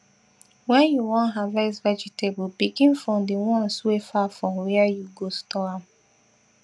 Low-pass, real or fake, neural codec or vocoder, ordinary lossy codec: none; real; none; none